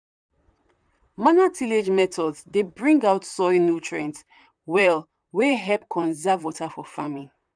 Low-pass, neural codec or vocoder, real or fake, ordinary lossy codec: 9.9 kHz; vocoder, 44.1 kHz, 128 mel bands, Pupu-Vocoder; fake; none